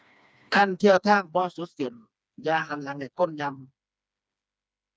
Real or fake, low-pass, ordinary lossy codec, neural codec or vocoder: fake; none; none; codec, 16 kHz, 2 kbps, FreqCodec, smaller model